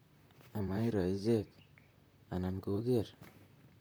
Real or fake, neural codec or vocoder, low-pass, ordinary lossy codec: fake; vocoder, 44.1 kHz, 128 mel bands, Pupu-Vocoder; none; none